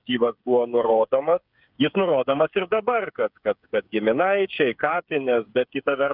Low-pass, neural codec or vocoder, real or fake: 5.4 kHz; codec, 16 kHz, 8 kbps, FreqCodec, smaller model; fake